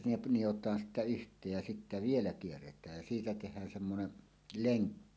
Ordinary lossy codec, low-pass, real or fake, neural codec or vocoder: none; none; real; none